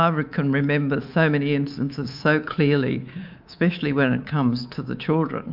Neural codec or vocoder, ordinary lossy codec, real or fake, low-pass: codec, 24 kHz, 3.1 kbps, DualCodec; MP3, 48 kbps; fake; 5.4 kHz